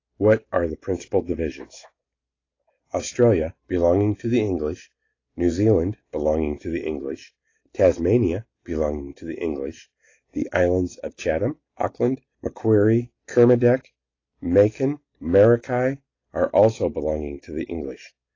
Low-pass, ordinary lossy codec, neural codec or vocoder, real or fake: 7.2 kHz; AAC, 32 kbps; none; real